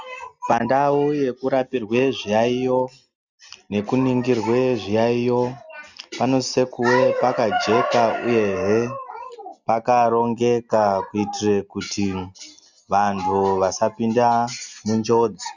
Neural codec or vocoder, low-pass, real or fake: none; 7.2 kHz; real